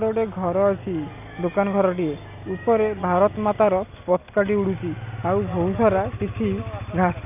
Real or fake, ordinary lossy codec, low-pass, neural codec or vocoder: real; none; 3.6 kHz; none